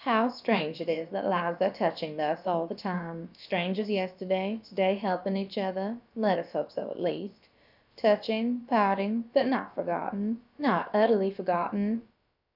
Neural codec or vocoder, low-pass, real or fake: codec, 16 kHz, about 1 kbps, DyCAST, with the encoder's durations; 5.4 kHz; fake